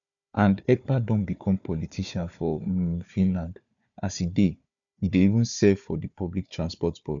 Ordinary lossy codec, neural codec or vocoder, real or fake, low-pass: none; codec, 16 kHz, 4 kbps, FunCodec, trained on Chinese and English, 50 frames a second; fake; 7.2 kHz